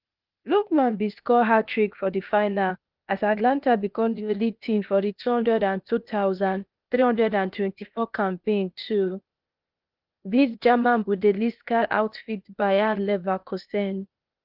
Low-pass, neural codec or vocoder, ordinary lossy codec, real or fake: 5.4 kHz; codec, 16 kHz, 0.8 kbps, ZipCodec; Opus, 32 kbps; fake